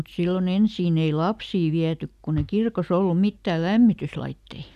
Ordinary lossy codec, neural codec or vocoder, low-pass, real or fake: none; none; 14.4 kHz; real